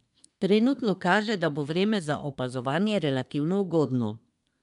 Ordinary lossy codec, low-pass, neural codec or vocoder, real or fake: none; 10.8 kHz; codec, 24 kHz, 1 kbps, SNAC; fake